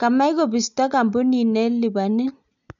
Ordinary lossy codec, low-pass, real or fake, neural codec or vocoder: MP3, 64 kbps; 7.2 kHz; real; none